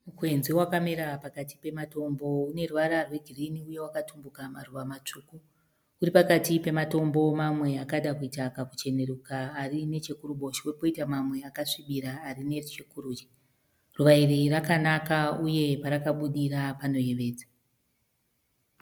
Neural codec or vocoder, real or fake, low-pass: none; real; 19.8 kHz